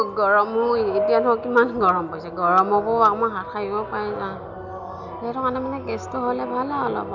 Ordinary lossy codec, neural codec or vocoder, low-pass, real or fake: none; none; 7.2 kHz; real